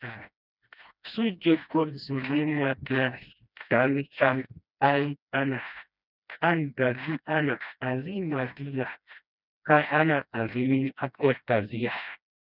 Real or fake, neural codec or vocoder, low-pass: fake; codec, 16 kHz, 1 kbps, FreqCodec, smaller model; 5.4 kHz